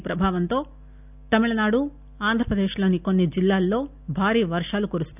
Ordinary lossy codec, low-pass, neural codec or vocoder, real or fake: none; 3.6 kHz; none; real